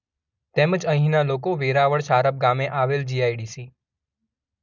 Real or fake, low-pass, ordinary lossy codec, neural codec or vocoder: real; 7.2 kHz; none; none